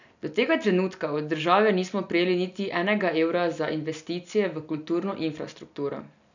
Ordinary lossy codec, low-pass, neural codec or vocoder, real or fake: none; 7.2 kHz; none; real